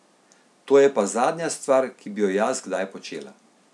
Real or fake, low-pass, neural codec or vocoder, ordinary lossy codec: real; none; none; none